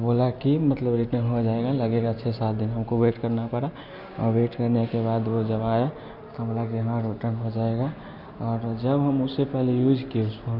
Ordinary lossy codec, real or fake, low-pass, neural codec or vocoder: none; real; 5.4 kHz; none